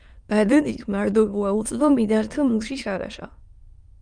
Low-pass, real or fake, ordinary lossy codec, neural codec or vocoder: 9.9 kHz; fake; Opus, 32 kbps; autoencoder, 22.05 kHz, a latent of 192 numbers a frame, VITS, trained on many speakers